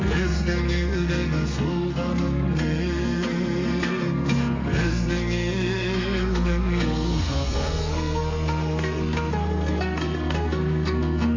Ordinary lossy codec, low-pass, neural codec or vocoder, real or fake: MP3, 48 kbps; 7.2 kHz; codec, 32 kHz, 1.9 kbps, SNAC; fake